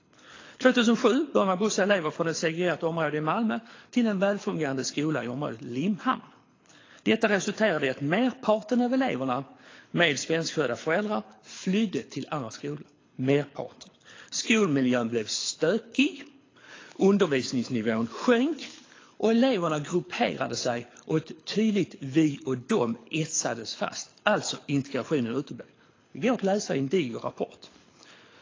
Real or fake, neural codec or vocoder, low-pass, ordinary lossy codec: fake; codec, 24 kHz, 6 kbps, HILCodec; 7.2 kHz; AAC, 32 kbps